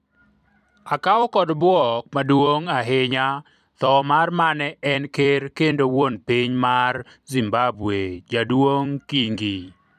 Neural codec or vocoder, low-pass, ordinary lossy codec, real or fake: vocoder, 44.1 kHz, 128 mel bands every 256 samples, BigVGAN v2; 14.4 kHz; none; fake